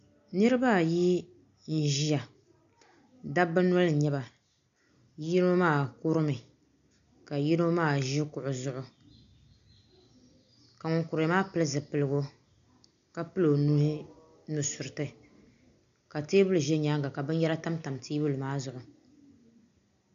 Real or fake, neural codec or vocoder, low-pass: real; none; 7.2 kHz